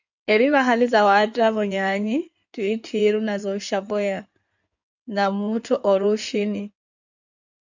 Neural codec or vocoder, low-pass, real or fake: codec, 16 kHz in and 24 kHz out, 2.2 kbps, FireRedTTS-2 codec; 7.2 kHz; fake